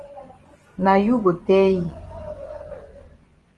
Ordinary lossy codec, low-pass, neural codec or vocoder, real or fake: Opus, 24 kbps; 10.8 kHz; vocoder, 24 kHz, 100 mel bands, Vocos; fake